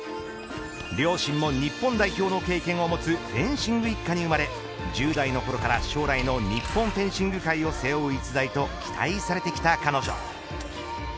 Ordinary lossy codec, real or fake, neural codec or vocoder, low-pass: none; real; none; none